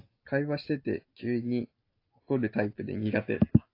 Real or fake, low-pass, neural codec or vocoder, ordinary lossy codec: real; 5.4 kHz; none; AAC, 32 kbps